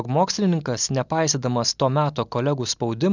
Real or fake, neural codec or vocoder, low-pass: real; none; 7.2 kHz